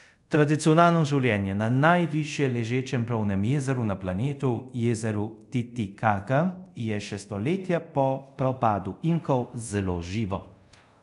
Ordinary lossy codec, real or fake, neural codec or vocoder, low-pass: none; fake; codec, 24 kHz, 0.5 kbps, DualCodec; 10.8 kHz